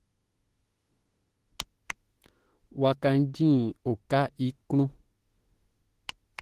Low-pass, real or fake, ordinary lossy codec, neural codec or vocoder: 14.4 kHz; fake; Opus, 16 kbps; autoencoder, 48 kHz, 32 numbers a frame, DAC-VAE, trained on Japanese speech